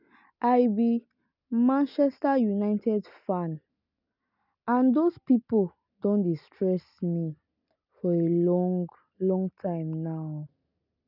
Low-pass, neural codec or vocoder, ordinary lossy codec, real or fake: 5.4 kHz; none; none; real